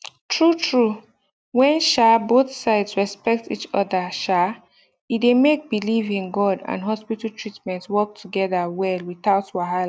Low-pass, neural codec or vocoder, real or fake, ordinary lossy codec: none; none; real; none